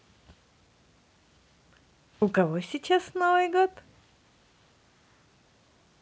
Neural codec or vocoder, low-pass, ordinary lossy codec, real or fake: none; none; none; real